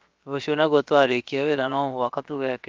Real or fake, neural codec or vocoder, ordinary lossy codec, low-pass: fake; codec, 16 kHz, about 1 kbps, DyCAST, with the encoder's durations; Opus, 24 kbps; 7.2 kHz